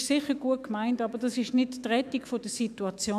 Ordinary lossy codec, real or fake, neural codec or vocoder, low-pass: none; fake; autoencoder, 48 kHz, 128 numbers a frame, DAC-VAE, trained on Japanese speech; 14.4 kHz